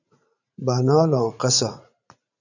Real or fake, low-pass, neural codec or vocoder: fake; 7.2 kHz; vocoder, 44.1 kHz, 80 mel bands, Vocos